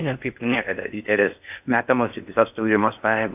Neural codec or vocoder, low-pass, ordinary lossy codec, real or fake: codec, 16 kHz in and 24 kHz out, 0.6 kbps, FocalCodec, streaming, 4096 codes; 3.6 kHz; AAC, 32 kbps; fake